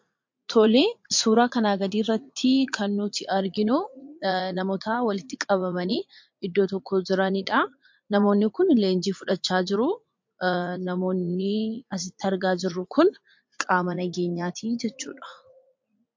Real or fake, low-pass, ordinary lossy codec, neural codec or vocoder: fake; 7.2 kHz; MP3, 48 kbps; vocoder, 44.1 kHz, 80 mel bands, Vocos